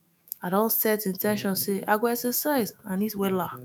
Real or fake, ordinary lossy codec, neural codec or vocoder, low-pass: fake; none; autoencoder, 48 kHz, 128 numbers a frame, DAC-VAE, trained on Japanese speech; none